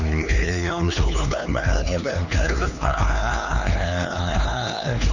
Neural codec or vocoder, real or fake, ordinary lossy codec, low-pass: codec, 16 kHz, 2 kbps, X-Codec, HuBERT features, trained on LibriSpeech; fake; none; 7.2 kHz